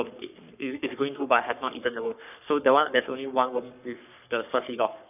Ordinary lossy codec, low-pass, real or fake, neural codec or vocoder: none; 3.6 kHz; fake; codec, 44.1 kHz, 3.4 kbps, Pupu-Codec